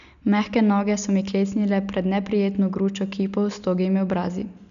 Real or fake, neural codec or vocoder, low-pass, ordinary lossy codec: real; none; 7.2 kHz; none